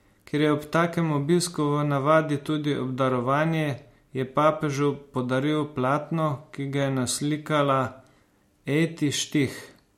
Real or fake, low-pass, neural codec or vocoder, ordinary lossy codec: real; 19.8 kHz; none; MP3, 64 kbps